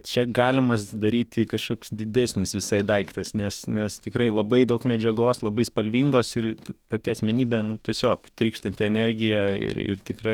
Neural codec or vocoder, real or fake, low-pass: codec, 44.1 kHz, 2.6 kbps, DAC; fake; 19.8 kHz